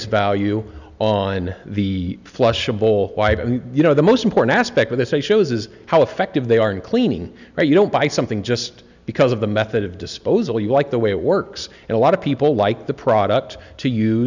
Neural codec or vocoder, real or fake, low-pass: none; real; 7.2 kHz